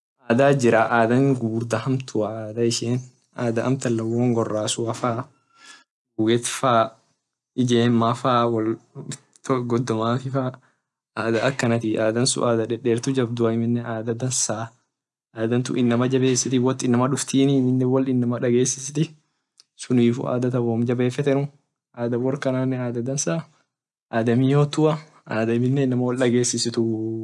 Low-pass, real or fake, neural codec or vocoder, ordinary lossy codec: none; real; none; none